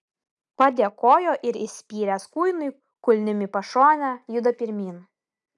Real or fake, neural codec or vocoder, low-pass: real; none; 10.8 kHz